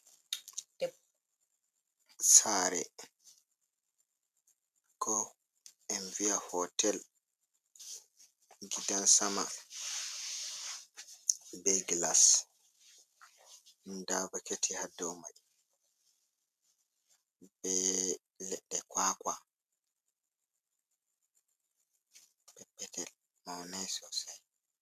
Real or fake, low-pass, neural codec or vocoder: real; 14.4 kHz; none